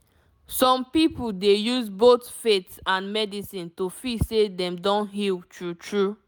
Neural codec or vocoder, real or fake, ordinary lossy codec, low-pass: none; real; none; none